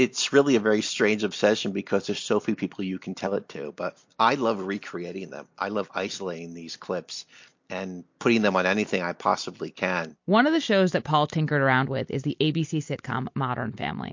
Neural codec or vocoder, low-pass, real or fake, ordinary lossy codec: none; 7.2 kHz; real; MP3, 48 kbps